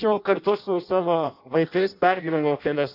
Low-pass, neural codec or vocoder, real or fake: 5.4 kHz; codec, 16 kHz in and 24 kHz out, 0.6 kbps, FireRedTTS-2 codec; fake